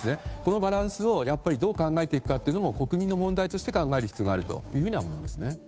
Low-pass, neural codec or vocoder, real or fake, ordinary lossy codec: none; codec, 16 kHz, 2 kbps, FunCodec, trained on Chinese and English, 25 frames a second; fake; none